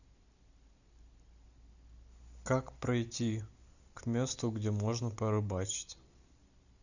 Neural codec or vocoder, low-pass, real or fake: none; 7.2 kHz; real